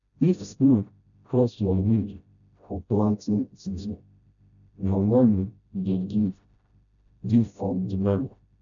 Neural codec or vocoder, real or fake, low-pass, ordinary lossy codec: codec, 16 kHz, 0.5 kbps, FreqCodec, smaller model; fake; 7.2 kHz; none